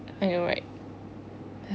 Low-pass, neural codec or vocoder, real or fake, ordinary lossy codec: none; none; real; none